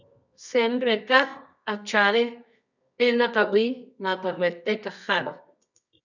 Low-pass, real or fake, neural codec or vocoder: 7.2 kHz; fake; codec, 24 kHz, 0.9 kbps, WavTokenizer, medium music audio release